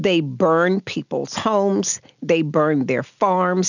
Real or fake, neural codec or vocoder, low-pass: real; none; 7.2 kHz